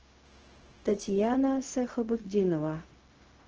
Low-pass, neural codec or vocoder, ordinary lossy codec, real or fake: 7.2 kHz; codec, 16 kHz, 0.4 kbps, LongCat-Audio-Codec; Opus, 16 kbps; fake